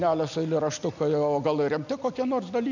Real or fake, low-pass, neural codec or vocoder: real; 7.2 kHz; none